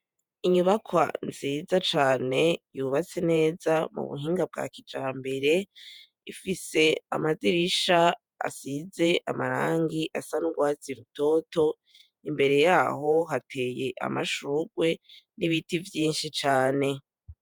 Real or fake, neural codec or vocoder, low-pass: fake; vocoder, 48 kHz, 128 mel bands, Vocos; 19.8 kHz